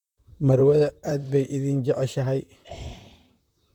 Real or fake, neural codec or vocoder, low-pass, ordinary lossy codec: fake; vocoder, 44.1 kHz, 128 mel bands, Pupu-Vocoder; 19.8 kHz; none